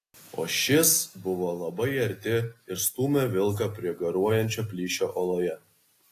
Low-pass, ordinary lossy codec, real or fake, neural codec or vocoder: 14.4 kHz; AAC, 48 kbps; real; none